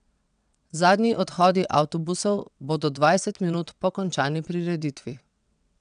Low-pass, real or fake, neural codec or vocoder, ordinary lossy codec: 9.9 kHz; fake; vocoder, 22.05 kHz, 80 mel bands, WaveNeXt; none